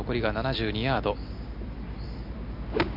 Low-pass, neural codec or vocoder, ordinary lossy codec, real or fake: 5.4 kHz; none; MP3, 48 kbps; real